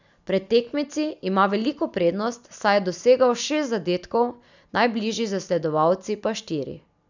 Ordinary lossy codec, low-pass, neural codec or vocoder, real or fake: none; 7.2 kHz; none; real